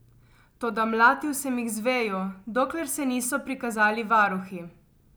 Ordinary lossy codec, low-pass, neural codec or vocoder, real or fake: none; none; none; real